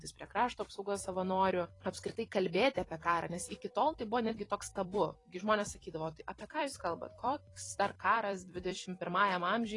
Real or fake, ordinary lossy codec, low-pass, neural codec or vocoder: fake; AAC, 32 kbps; 10.8 kHz; vocoder, 44.1 kHz, 128 mel bands every 256 samples, BigVGAN v2